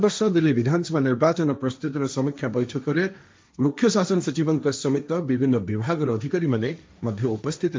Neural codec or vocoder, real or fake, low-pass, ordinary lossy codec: codec, 16 kHz, 1.1 kbps, Voila-Tokenizer; fake; none; none